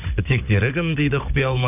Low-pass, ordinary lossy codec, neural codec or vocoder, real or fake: 3.6 kHz; none; codec, 16 kHz, 16 kbps, FunCodec, trained on Chinese and English, 50 frames a second; fake